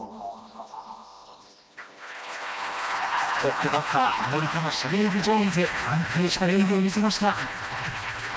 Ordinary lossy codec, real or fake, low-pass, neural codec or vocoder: none; fake; none; codec, 16 kHz, 1 kbps, FreqCodec, smaller model